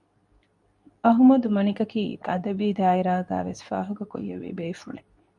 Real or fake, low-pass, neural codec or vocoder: fake; 10.8 kHz; codec, 24 kHz, 0.9 kbps, WavTokenizer, medium speech release version 2